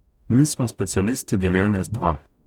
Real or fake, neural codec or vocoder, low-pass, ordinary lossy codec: fake; codec, 44.1 kHz, 0.9 kbps, DAC; 19.8 kHz; none